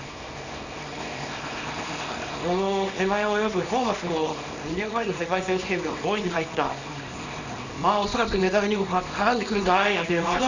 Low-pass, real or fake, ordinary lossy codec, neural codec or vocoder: 7.2 kHz; fake; none; codec, 24 kHz, 0.9 kbps, WavTokenizer, small release